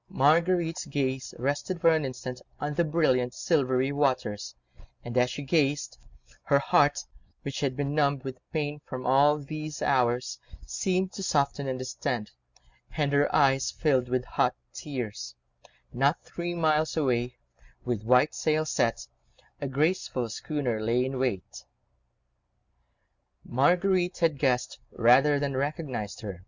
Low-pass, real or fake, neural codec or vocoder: 7.2 kHz; real; none